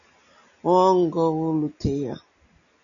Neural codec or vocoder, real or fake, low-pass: none; real; 7.2 kHz